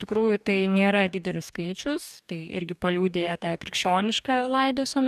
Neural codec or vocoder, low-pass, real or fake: codec, 44.1 kHz, 2.6 kbps, DAC; 14.4 kHz; fake